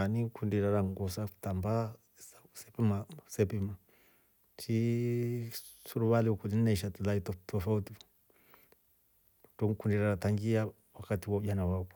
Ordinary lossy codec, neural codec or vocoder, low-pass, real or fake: none; none; none; real